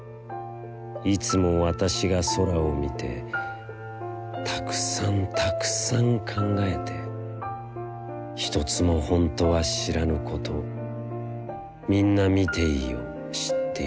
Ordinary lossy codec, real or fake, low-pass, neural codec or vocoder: none; real; none; none